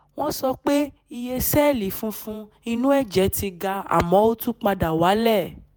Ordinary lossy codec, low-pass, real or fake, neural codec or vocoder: none; none; fake; vocoder, 48 kHz, 128 mel bands, Vocos